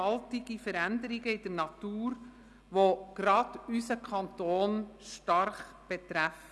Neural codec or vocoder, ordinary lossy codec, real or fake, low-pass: none; none; real; none